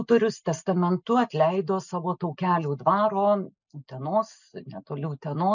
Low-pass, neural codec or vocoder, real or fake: 7.2 kHz; none; real